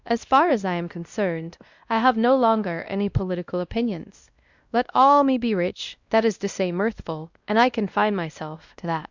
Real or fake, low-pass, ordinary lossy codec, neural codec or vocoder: fake; 7.2 kHz; Opus, 64 kbps; codec, 16 kHz, 1 kbps, X-Codec, WavLM features, trained on Multilingual LibriSpeech